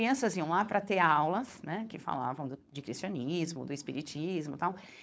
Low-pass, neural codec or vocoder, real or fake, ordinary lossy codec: none; codec, 16 kHz, 4.8 kbps, FACodec; fake; none